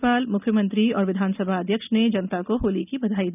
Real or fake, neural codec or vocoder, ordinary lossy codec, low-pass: real; none; none; 3.6 kHz